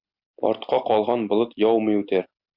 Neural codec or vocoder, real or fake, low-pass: none; real; 5.4 kHz